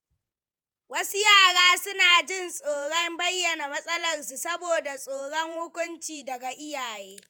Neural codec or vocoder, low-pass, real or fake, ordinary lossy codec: vocoder, 48 kHz, 128 mel bands, Vocos; none; fake; none